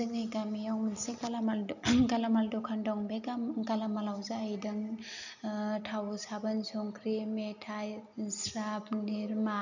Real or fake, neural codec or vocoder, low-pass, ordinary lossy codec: real; none; 7.2 kHz; none